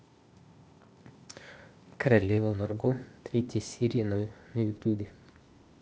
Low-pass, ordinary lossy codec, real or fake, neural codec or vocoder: none; none; fake; codec, 16 kHz, 0.8 kbps, ZipCodec